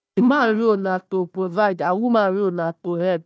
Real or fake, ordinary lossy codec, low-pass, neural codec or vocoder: fake; none; none; codec, 16 kHz, 1 kbps, FunCodec, trained on Chinese and English, 50 frames a second